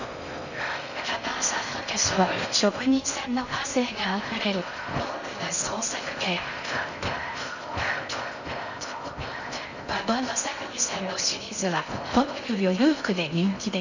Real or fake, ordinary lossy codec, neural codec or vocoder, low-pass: fake; none; codec, 16 kHz in and 24 kHz out, 0.6 kbps, FocalCodec, streaming, 4096 codes; 7.2 kHz